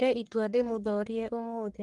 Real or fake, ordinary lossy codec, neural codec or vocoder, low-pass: fake; Opus, 24 kbps; codec, 44.1 kHz, 1.7 kbps, Pupu-Codec; 10.8 kHz